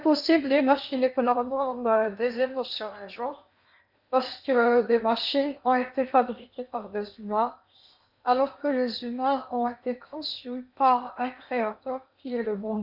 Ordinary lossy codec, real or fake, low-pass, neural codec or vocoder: none; fake; 5.4 kHz; codec, 16 kHz in and 24 kHz out, 0.8 kbps, FocalCodec, streaming, 65536 codes